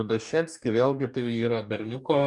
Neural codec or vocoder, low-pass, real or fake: codec, 44.1 kHz, 2.6 kbps, DAC; 10.8 kHz; fake